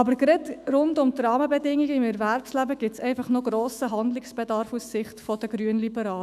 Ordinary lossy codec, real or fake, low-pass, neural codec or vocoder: none; fake; 14.4 kHz; autoencoder, 48 kHz, 128 numbers a frame, DAC-VAE, trained on Japanese speech